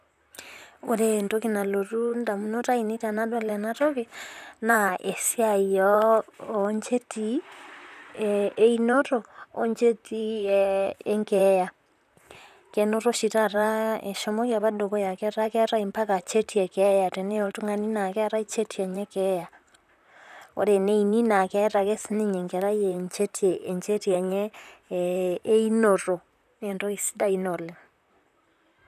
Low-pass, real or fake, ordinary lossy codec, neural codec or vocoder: 14.4 kHz; fake; none; vocoder, 44.1 kHz, 128 mel bands, Pupu-Vocoder